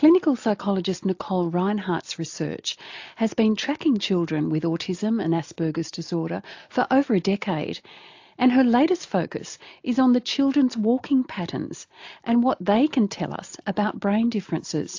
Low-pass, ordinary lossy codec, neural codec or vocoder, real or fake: 7.2 kHz; AAC, 48 kbps; none; real